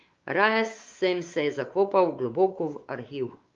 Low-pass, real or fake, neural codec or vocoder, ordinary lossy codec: 7.2 kHz; fake; codec, 16 kHz, 4 kbps, X-Codec, WavLM features, trained on Multilingual LibriSpeech; Opus, 32 kbps